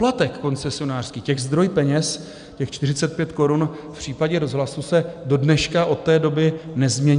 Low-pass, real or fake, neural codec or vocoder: 9.9 kHz; real; none